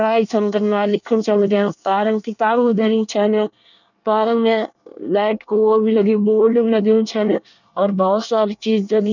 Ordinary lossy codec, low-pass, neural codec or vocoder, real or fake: none; 7.2 kHz; codec, 24 kHz, 1 kbps, SNAC; fake